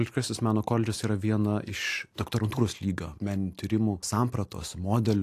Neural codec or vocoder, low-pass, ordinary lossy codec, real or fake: none; 14.4 kHz; AAC, 64 kbps; real